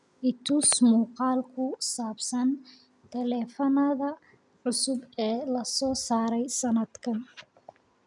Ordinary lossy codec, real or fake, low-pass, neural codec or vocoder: none; real; 10.8 kHz; none